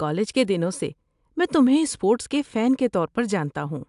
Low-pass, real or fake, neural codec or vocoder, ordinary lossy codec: 10.8 kHz; real; none; AAC, 96 kbps